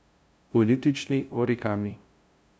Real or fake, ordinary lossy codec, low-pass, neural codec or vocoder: fake; none; none; codec, 16 kHz, 0.5 kbps, FunCodec, trained on LibriTTS, 25 frames a second